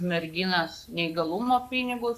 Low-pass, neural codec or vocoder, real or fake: 14.4 kHz; codec, 44.1 kHz, 7.8 kbps, Pupu-Codec; fake